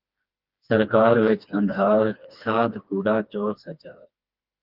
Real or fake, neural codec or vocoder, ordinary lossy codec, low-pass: fake; codec, 16 kHz, 2 kbps, FreqCodec, smaller model; Opus, 24 kbps; 5.4 kHz